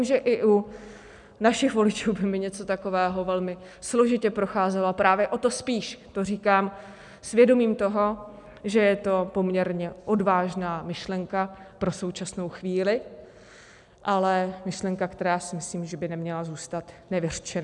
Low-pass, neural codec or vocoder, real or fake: 10.8 kHz; none; real